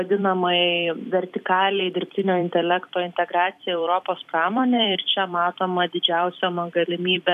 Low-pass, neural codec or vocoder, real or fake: 14.4 kHz; vocoder, 44.1 kHz, 128 mel bands every 256 samples, BigVGAN v2; fake